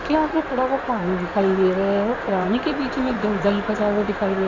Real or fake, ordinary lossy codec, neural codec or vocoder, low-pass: fake; none; codec, 16 kHz in and 24 kHz out, 2.2 kbps, FireRedTTS-2 codec; 7.2 kHz